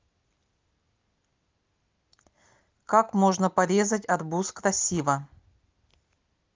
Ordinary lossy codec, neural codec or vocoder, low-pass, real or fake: Opus, 32 kbps; none; 7.2 kHz; real